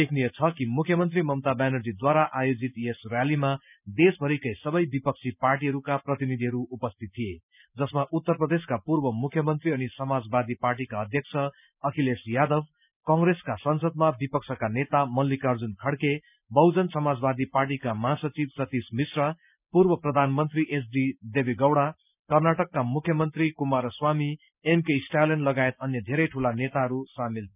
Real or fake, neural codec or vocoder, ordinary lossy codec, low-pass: real; none; none; 3.6 kHz